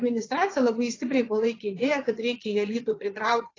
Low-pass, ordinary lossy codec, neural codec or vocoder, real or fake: 7.2 kHz; AAC, 32 kbps; vocoder, 22.05 kHz, 80 mel bands, Vocos; fake